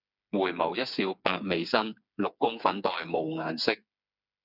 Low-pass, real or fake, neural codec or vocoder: 5.4 kHz; fake; codec, 16 kHz, 4 kbps, FreqCodec, smaller model